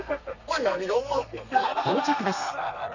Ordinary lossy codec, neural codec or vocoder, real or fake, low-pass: none; codec, 32 kHz, 1.9 kbps, SNAC; fake; 7.2 kHz